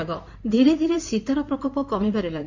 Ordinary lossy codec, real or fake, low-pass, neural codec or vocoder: Opus, 64 kbps; fake; 7.2 kHz; codec, 16 kHz in and 24 kHz out, 2.2 kbps, FireRedTTS-2 codec